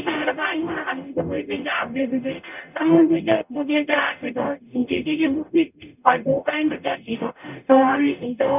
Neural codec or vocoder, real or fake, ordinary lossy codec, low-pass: codec, 44.1 kHz, 0.9 kbps, DAC; fake; none; 3.6 kHz